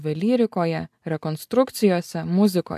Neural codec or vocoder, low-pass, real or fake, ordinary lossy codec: none; 14.4 kHz; real; AAC, 96 kbps